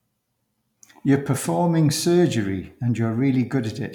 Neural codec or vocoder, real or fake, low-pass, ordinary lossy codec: none; real; 19.8 kHz; none